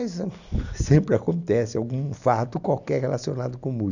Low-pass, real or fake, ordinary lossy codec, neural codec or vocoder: 7.2 kHz; real; none; none